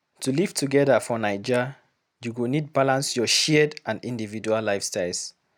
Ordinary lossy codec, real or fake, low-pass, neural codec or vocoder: none; real; none; none